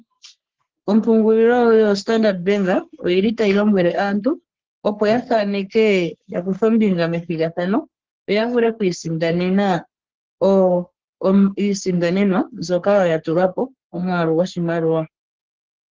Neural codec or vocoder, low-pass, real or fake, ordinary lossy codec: codec, 44.1 kHz, 3.4 kbps, Pupu-Codec; 7.2 kHz; fake; Opus, 16 kbps